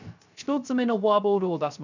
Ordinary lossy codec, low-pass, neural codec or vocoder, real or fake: none; 7.2 kHz; codec, 16 kHz, 0.3 kbps, FocalCodec; fake